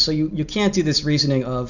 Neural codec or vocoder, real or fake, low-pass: none; real; 7.2 kHz